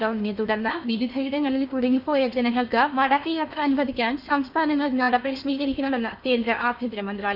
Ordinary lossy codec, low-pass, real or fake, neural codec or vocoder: none; 5.4 kHz; fake; codec, 16 kHz in and 24 kHz out, 0.8 kbps, FocalCodec, streaming, 65536 codes